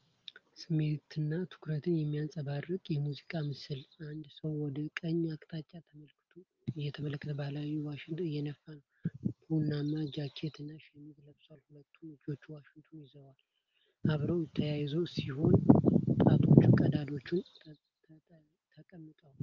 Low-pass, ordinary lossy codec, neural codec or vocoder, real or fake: 7.2 kHz; Opus, 24 kbps; none; real